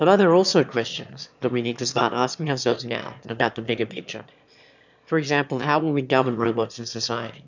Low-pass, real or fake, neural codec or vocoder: 7.2 kHz; fake; autoencoder, 22.05 kHz, a latent of 192 numbers a frame, VITS, trained on one speaker